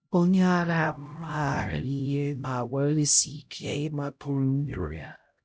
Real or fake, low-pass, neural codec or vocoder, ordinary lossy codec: fake; none; codec, 16 kHz, 0.5 kbps, X-Codec, HuBERT features, trained on LibriSpeech; none